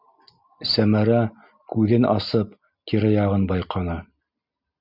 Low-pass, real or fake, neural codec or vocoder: 5.4 kHz; real; none